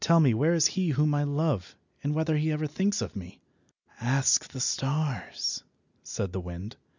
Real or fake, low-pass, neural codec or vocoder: real; 7.2 kHz; none